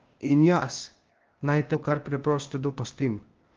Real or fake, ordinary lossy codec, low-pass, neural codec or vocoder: fake; Opus, 24 kbps; 7.2 kHz; codec, 16 kHz, 0.8 kbps, ZipCodec